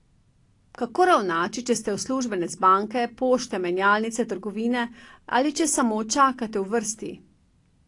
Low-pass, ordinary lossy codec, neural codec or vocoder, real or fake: 10.8 kHz; AAC, 48 kbps; none; real